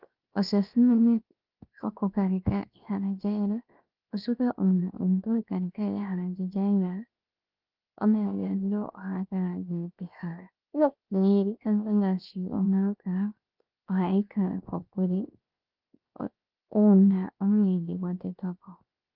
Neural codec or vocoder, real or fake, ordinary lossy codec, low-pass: codec, 16 kHz, 0.7 kbps, FocalCodec; fake; Opus, 24 kbps; 5.4 kHz